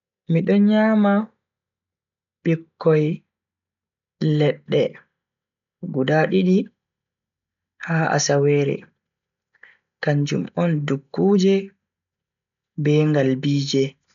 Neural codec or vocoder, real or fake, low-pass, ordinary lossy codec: none; real; 7.2 kHz; none